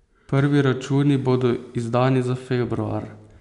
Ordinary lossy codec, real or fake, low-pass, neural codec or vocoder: MP3, 96 kbps; real; 10.8 kHz; none